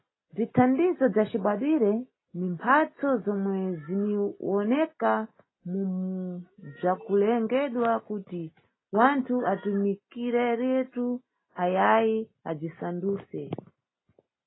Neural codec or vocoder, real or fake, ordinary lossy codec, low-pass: none; real; AAC, 16 kbps; 7.2 kHz